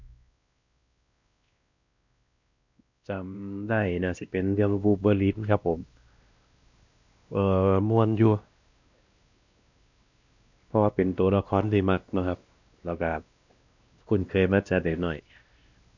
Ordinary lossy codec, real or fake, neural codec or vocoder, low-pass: none; fake; codec, 16 kHz, 1 kbps, X-Codec, WavLM features, trained on Multilingual LibriSpeech; 7.2 kHz